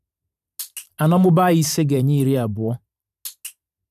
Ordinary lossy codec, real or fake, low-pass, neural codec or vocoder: none; fake; 14.4 kHz; vocoder, 44.1 kHz, 128 mel bands every 512 samples, BigVGAN v2